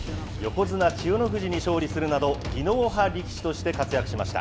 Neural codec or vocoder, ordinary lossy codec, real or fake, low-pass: none; none; real; none